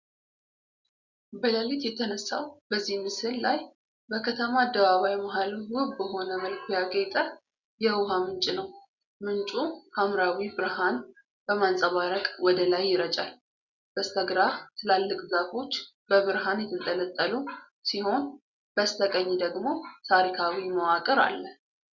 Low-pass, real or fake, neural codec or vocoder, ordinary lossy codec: 7.2 kHz; real; none; Opus, 64 kbps